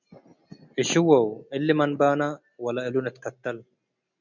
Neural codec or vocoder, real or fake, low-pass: none; real; 7.2 kHz